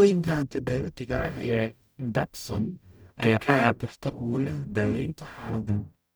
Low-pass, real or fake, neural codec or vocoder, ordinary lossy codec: none; fake; codec, 44.1 kHz, 0.9 kbps, DAC; none